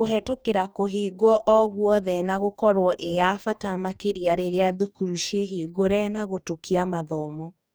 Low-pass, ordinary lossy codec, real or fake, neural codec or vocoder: none; none; fake; codec, 44.1 kHz, 2.6 kbps, DAC